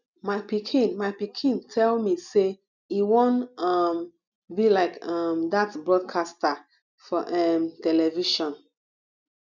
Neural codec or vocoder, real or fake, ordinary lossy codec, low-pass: none; real; none; 7.2 kHz